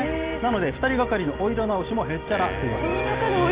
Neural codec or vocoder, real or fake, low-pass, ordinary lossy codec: none; real; 3.6 kHz; Opus, 32 kbps